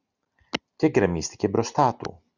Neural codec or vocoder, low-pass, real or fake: none; 7.2 kHz; real